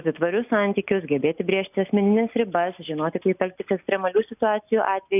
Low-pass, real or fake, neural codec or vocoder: 3.6 kHz; real; none